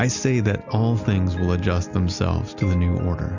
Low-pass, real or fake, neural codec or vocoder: 7.2 kHz; real; none